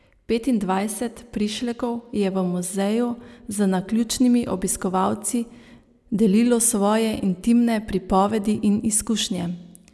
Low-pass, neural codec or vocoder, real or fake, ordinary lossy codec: none; none; real; none